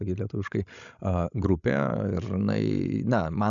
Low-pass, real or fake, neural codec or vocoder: 7.2 kHz; fake; codec, 16 kHz, 16 kbps, FreqCodec, larger model